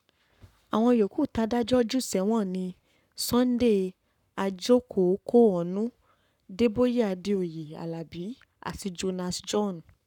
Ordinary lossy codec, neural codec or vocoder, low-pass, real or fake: none; codec, 44.1 kHz, 7.8 kbps, Pupu-Codec; 19.8 kHz; fake